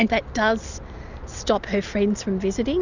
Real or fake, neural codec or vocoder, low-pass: real; none; 7.2 kHz